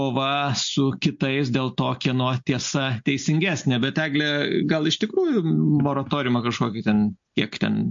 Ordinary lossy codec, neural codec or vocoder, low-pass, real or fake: MP3, 48 kbps; none; 7.2 kHz; real